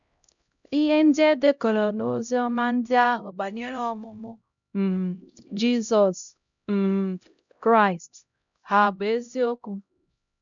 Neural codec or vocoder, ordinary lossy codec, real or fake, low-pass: codec, 16 kHz, 0.5 kbps, X-Codec, HuBERT features, trained on LibriSpeech; none; fake; 7.2 kHz